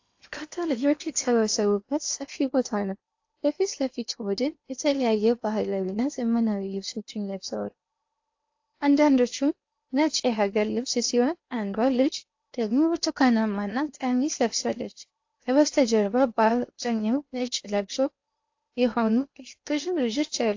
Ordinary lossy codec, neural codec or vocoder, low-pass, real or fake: AAC, 48 kbps; codec, 16 kHz in and 24 kHz out, 0.8 kbps, FocalCodec, streaming, 65536 codes; 7.2 kHz; fake